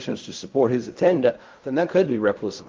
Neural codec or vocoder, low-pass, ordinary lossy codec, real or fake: codec, 16 kHz in and 24 kHz out, 0.4 kbps, LongCat-Audio-Codec, fine tuned four codebook decoder; 7.2 kHz; Opus, 32 kbps; fake